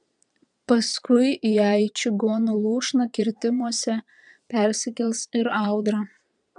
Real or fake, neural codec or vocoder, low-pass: fake; vocoder, 22.05 kHz, 80 mel bands, Vocos; 9.9 kHz